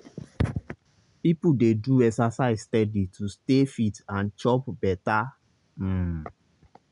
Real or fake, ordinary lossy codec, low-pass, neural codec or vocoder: real; none; 10.8 kHz; none